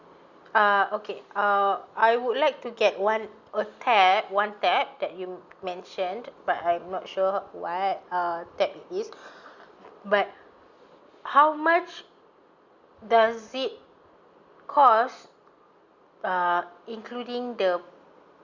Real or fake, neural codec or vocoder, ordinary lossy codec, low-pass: real; none; Opus, 64 kbps; 7.2 kHz